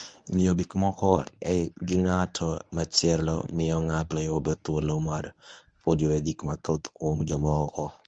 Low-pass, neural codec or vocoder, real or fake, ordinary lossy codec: 9.9 kHz; codec, 24 kHz, 0.9 kbps, WavTokenizer, medium speech release version 1; fake; Opus, 32 kbps